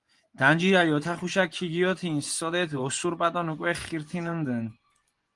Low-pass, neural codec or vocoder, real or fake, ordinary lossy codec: 10.8 kHz; vocoder, 24 kHz, 100 mel bands, Vocos; fake; Opus, 24 kbps